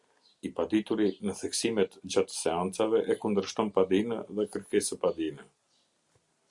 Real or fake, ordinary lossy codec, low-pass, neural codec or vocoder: real; Opus, 64 kbps; 10.8 kHz; none